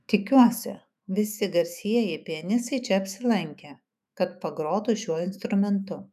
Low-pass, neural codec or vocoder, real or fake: 14.4 kHz; autoencoder, 48 kHz, 128 numbers a frame, DAC-VAE, trained on Japanese speech; fake